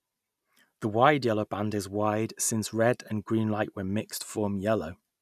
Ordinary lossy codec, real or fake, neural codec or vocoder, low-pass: none; real; none; 14.4 kHz